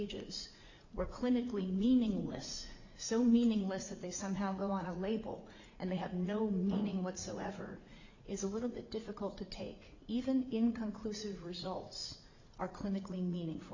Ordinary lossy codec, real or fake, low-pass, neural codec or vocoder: Opus, 64 kbps; fake; 7.2 kHz; vocoder, 44.1 kHz, 80 mel bands, Vocos